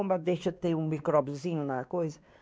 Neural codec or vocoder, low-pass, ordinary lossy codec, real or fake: codec, 16 kHz, 2 kbps, X-Codec, WavLM features, trained on Multilingual LibriSpeech; none; none; fake